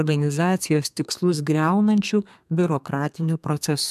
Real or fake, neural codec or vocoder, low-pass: fake; codec, 32 kHz, 1.9 kbps, SNAC; 14.4 kHz